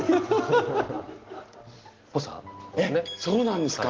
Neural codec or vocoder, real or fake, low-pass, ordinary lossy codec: none; real; 7.2 kHz; Opus, 16 kbps